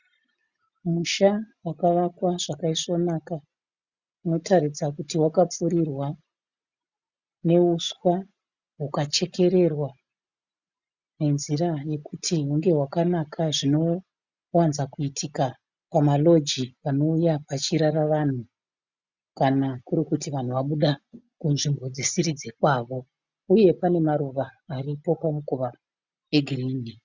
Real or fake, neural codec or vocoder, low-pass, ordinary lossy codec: real; none; 7.2 kHz; Opus, 64 kbps